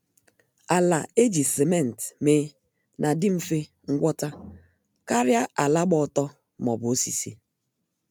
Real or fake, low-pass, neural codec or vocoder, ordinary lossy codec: real; none; none; none